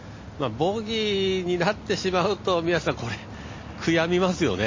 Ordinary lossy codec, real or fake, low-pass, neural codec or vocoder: MP3, 32 kbps; real; 7.2 kHz; none